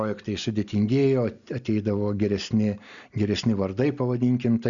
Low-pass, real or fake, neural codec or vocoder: 7.2 kHz; real; none